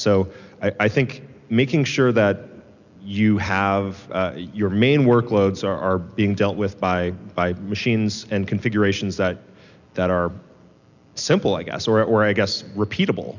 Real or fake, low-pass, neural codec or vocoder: real; 7.2 kHz; none